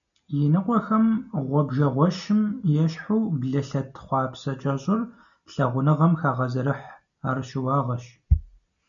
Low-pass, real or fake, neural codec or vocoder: 7.2 kHz; real; none